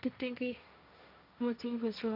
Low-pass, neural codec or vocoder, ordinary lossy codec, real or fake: 5.4 kHz; codec, 16 kHz, 1.1 kbps, Voila-Tokenizer; none; fake